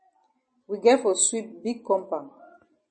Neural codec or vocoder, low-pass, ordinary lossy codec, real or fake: none; 9.9 kHz; MP3, 32 kbps; real